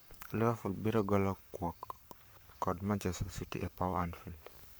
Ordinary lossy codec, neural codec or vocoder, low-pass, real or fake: none; codec, 44.1 kHz, 7.8 kbps, Pupu-Codec; none; fake